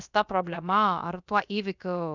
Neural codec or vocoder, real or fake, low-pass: codec, 16 kHz, about 1 kbps, DyCAST, with the encoder's durations; fake; 7.2 kHz